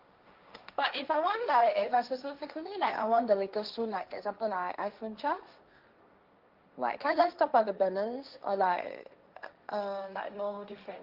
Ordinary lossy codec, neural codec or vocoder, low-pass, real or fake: Opus, 24 kbps; codec, 16 kHz, 1.1 kbps, Voila-Tokenizer; 5.4 kHz; fake